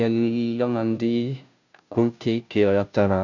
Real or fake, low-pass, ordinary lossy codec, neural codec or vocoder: fake; 7.2 kHz; none; codec, 16 kHz, 0.5 kbps, FunCodec, trained on Chinese and English, 25 frames a second